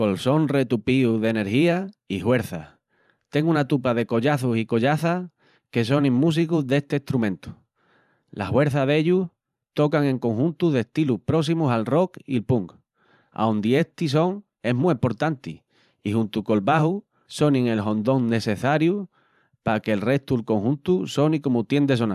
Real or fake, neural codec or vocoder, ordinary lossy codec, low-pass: fake; vocoder, 44.1 kHz, 128 mel bands every 512 samples, BigVGAN v2; none; 14.4 kHz